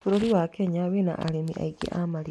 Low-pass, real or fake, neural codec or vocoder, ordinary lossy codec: none; real; none; none